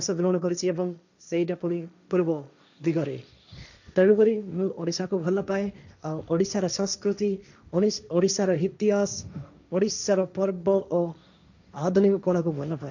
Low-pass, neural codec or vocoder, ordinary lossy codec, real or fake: 7.2 kHz; codec, 16 kHz, 1.1 kbps, Voila-Tokenizer; none; fake